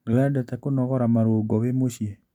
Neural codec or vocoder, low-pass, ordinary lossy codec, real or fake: none; 19.8 kHz; none; real